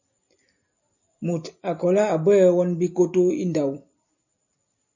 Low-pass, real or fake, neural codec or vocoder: 7.2 kHz; real; none